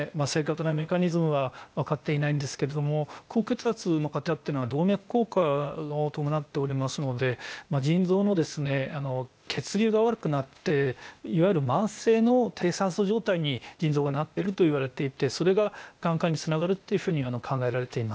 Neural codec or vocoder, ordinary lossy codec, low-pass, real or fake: codec, 16 kHz, 0.8 kbps, ZipCodec; none; none; fake